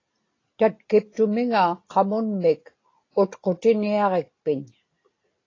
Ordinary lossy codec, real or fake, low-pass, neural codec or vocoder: AAC, 32 kbps; real; 7.2 kHz; none